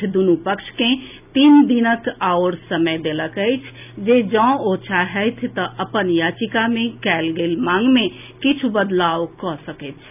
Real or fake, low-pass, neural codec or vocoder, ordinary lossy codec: real; 3.6 kHz; none; none